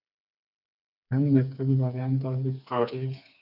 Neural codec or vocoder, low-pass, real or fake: codec, 16 kHz, 4 kbps, FreqCodec, smaller model; 5.4 kHz; fake